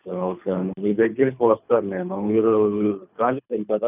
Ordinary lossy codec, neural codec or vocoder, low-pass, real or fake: none; codec, 24 kHz, 3 kbps, HILCodec; 3.6 kHz; fake